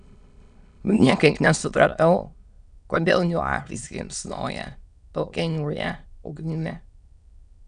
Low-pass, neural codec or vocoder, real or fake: 9.9 kHz; autoencoder, 22.05 kHz, a latent of 192 numbers a frame, VITS, trained on many speakers; fake